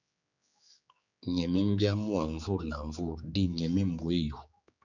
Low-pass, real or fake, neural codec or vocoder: 7.2 kHz; fake; codec, 16 kHz, 4 kbps, X-Codec, HuBERT features, trained on general audio